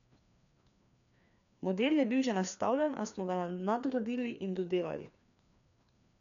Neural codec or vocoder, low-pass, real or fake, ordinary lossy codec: codec, 16 kHz, 2 kbps, FreqCodec, larger model; 7.2 kHz; fake; none